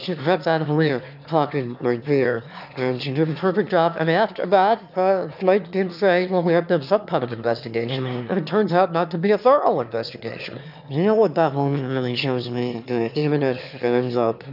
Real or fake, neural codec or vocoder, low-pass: fake; autoencoder, 22.05 kHz, a latent of 192 numbers a frame, VITS, trained on one speaker; 5.4 kHz